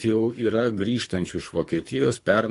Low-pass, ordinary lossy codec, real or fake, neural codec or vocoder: 10.8 kHz; AAC, 48 kbps; fake; codec, 24 kHz, 3 kbps, HILCodec